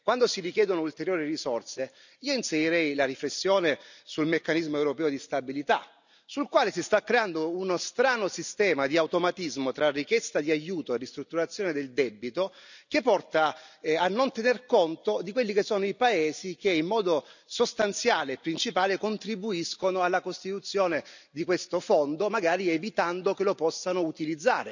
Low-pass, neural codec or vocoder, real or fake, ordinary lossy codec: 7.2 kHz; none; real; none